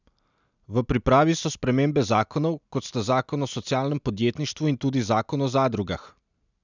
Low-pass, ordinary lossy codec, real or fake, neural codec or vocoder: 7.2 kHz; none; real; none